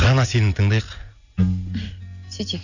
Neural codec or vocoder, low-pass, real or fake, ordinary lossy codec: none; 7.2 kHz; real; none